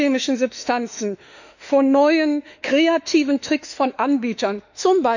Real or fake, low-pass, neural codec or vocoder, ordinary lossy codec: fake; 7.2 kHz; autoencoder, 48 kHz, 32 numbers a frame, DAC-VAE, trained on Japanese speech; none